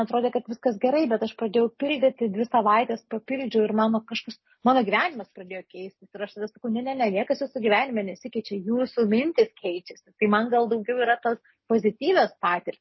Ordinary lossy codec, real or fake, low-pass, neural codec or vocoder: MP3, 24 kbps; real; 7.2 kHz; none